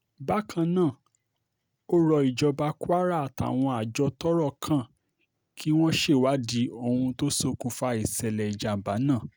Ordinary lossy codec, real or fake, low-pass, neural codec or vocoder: none; real; none; none